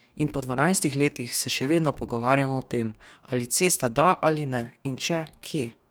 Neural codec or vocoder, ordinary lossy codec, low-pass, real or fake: codec, 44.1 kHz, 2.6 kbps, DAC; none; none; fake